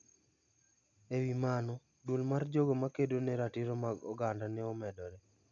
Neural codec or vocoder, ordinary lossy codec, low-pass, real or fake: none; none; 7.2 kHz; real